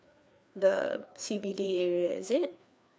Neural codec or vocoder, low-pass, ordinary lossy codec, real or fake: codec, 16 kHz, 2 kbps, FreqCodec, larger model; none; none; fake